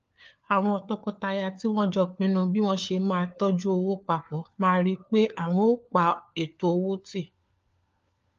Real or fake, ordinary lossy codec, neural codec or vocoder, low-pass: fake; Opus, 32 kbps; codec, 16 kHz, 4 kbps, FunCodec, trained on LibriTTS, 50 frames a second; 7.2 kHz